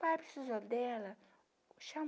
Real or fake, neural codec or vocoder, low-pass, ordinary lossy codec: real; none; none; none